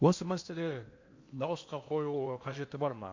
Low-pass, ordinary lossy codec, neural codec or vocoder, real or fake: 7.2 kHz; MP3, 48 kbps; codec, 16 kHz in and 24 kHz out, 0.8 kbps, FocalCodec, streaming, 65536 codes; fake